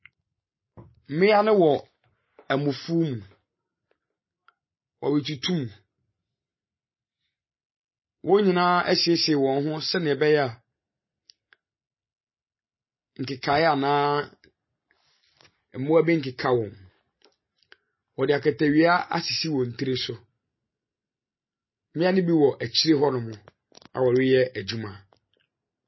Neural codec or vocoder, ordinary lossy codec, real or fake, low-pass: none; MP3, 24 kbps; real; 7.2 kHz